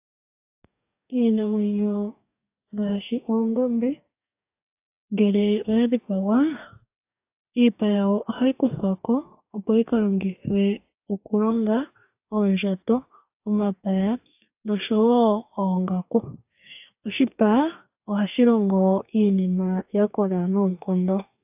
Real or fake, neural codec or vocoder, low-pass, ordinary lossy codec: fake; codec, 44.1 kHz, 2.6 kbps, DAC; 3.6 kHz; AAC, 32 kbps